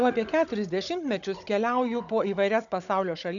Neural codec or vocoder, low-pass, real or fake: codec, 16 kHz, 16 kbps, FunCodec, trained on Chinese and English, 50 frames a second; 7.2 kHz; fake